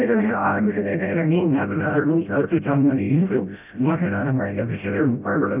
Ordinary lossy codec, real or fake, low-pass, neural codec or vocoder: none; fake; 3.6 kHz; codec, 16 kHz, 0.5 kbps, FreqCodec, smaller model